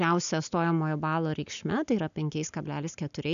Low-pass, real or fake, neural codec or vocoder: 7.2 kHz; real; none